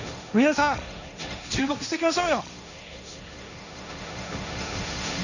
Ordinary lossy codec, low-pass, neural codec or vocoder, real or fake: none; 7.2 kHz; codec, 16 kHz, 1.1 kbps, Voila-Tokenizer; fake